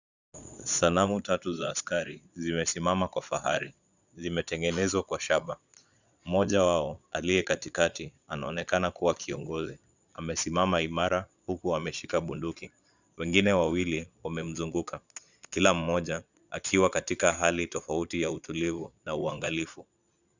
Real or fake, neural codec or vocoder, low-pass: fake; vocoder, 22.05 kHz, 80 mel bands, Vocos; 7.2 kHz